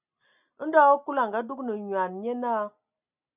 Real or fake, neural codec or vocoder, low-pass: real; none; 3.6 kHz